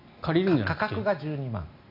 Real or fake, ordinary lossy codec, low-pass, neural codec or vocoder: real; MP3, 48 kbps; 5.4 kHz; none